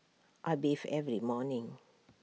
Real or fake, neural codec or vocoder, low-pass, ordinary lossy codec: real; none; none; none